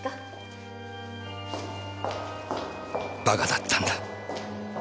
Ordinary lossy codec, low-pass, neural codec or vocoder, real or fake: none; none; none; real